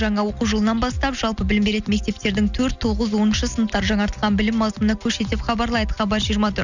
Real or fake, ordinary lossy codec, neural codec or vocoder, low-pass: real; none; none; 7.2 kHz